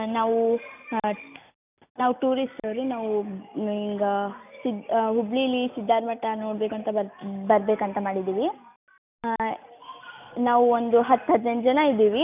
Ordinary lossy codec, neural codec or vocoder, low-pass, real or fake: none; none; 3.6 kHz; real